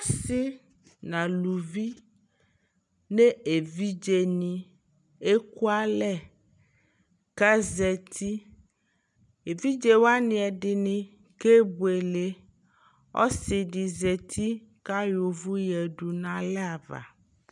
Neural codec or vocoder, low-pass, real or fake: none; 10.8 kHz; real